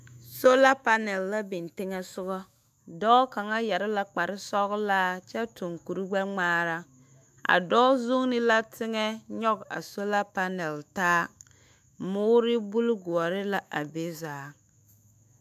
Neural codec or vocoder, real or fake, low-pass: autoencoder, 48 kHz, 128 numbers a frame, DAC-VAE, trained on Japanese speech; fake; 14.4 kHz